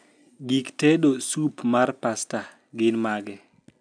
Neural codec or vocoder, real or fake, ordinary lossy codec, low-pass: none; real; none; 9.9 kHz